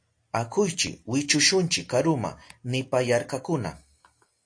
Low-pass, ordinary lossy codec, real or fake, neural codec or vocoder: 9.9 kHz; AAC, 48 kbps; real; none